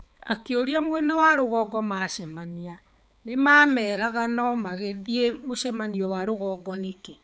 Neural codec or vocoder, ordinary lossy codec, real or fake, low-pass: codec, 16 kHz, 4 kbps, X-Codec, HuBERT features, trained on balanced general audio; none; fake; none